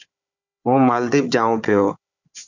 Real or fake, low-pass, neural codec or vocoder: fake; 7.2 kHz; codec, 16 kHz, 4 kbps, FunCodec, trained on Chinese and English, 50 frames a second